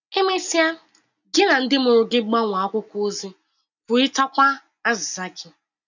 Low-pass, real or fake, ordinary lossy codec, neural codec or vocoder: 7.2 kHz; real; none; none